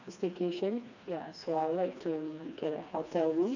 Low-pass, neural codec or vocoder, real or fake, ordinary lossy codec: 7.2 kHz; codec, 16 kHz, 2 kbps, FreqCodec, smaller model; fake; none